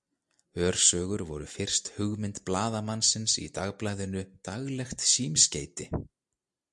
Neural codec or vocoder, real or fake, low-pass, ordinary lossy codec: none; real; 10.8 kHz; MP3, 64 kbps